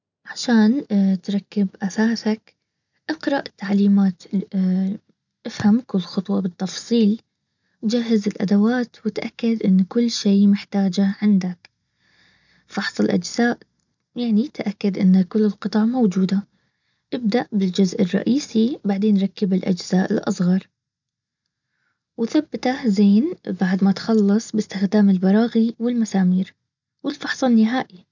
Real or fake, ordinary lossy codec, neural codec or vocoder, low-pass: real; none; none; 7.2 kHz